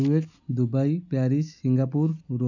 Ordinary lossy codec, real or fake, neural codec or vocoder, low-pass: none; real; none; 7.2 kHz